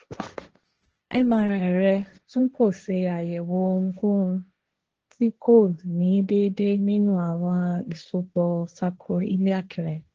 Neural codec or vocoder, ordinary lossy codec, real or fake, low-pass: codec, 16 kHz, 1.1 kbps, Voila-Tokenizer; Opus, 16 kbps; fake; 7.2 kHz